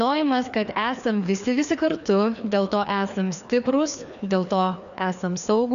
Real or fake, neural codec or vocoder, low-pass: fake; codec, 16 kHz, 2 kbps, FreqCodec, larger model; 7.2 kHz